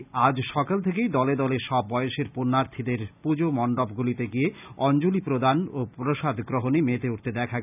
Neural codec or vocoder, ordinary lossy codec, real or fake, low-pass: none; none; real; 3.6 kHz